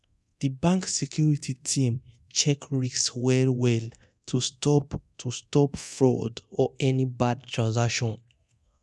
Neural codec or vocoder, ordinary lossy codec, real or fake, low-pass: codec, 24 kHz, 0.9 kbps, DualCodec; none; fake; none